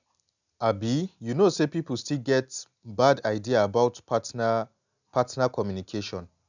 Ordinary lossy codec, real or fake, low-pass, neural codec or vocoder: none; real; 7.2 kHz; none